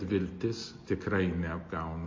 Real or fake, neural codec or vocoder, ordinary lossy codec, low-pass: real; none; MP3, 32 kbps; 7.2 kHz